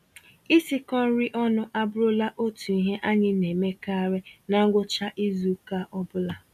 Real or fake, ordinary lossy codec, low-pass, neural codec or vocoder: real; none; 14.4 kHz; none